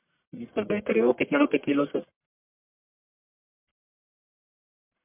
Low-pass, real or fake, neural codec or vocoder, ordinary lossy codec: 3.6 kHz; fake; codec, 44.1 kHz, 1.7 kbps, Pupu-Codec; MP3, 32 kbps